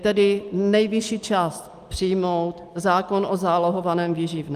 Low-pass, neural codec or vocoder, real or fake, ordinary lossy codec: 14.4 kHz; none; real; Opus, 32 kbps